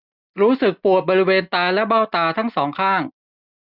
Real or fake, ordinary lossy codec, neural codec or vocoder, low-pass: real; none; none; 5.4 kHz